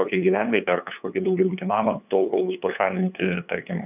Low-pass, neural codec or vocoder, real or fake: 3.6 kHz; codec, 16 kHz, 2 kbps, FunCodec, trained on LibriTTS, 25 frames a second; fake